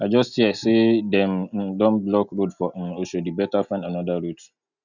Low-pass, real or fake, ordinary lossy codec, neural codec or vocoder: 7.2 kHz; real; none; none